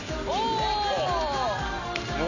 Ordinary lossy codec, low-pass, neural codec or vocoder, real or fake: none; 7.2 kHz; none; real